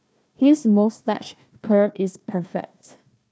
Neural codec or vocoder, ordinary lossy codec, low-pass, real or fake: codec, 16 kHz, 1 kbps, FunCodec, trained on Chinese and English, 50 frames a second; none; none; fake